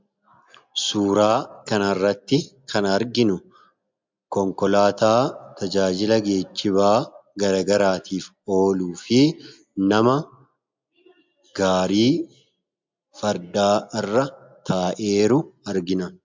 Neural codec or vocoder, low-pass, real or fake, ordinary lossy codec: none; 7.2 kHz; real; MP3, 64 kbps